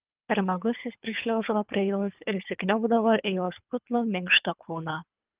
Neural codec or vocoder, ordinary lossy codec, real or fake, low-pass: codec, 24 kHz, 3 kbps, HILCodec; Opus, 32 kbps; fake; 3.6 kHz